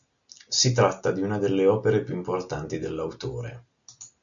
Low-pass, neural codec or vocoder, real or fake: 7.2 kHz; none; real